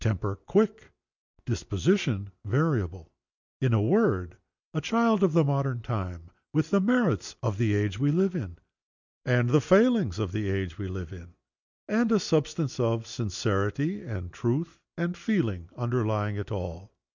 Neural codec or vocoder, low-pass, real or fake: none; 7.2 kHz; real